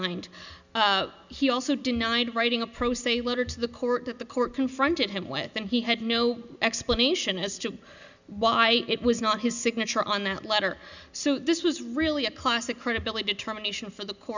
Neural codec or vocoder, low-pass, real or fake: none; 7.2 kHz; real